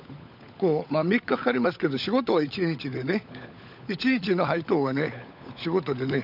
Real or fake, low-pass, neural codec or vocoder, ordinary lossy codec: fake; 5.4 kHz; codec, 16 kHz, 16 kbps, FunCodec, trained on LibriTTS, 50 frames a second; none